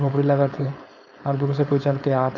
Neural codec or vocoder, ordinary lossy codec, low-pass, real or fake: codec, 16 kHz, 4.8 kbps, FACodec; none; 7.2 kHz; fake